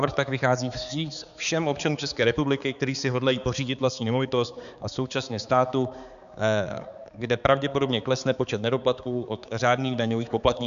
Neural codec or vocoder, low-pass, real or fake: codec, 16 kHz, 4 kbps, X-Codec, HuBERT features, trained on balanced general audio; 7.2 kHz; fake